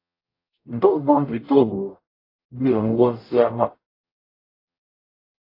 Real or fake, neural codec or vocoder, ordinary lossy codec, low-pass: fake; codec, 44.1 kHz, 0.9 kbps, DAC; AAC, 32 kbps; 5.4 kHz